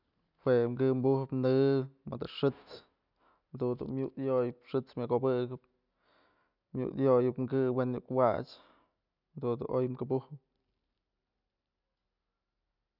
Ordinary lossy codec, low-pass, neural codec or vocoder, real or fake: none; 5.4 kHz; none; real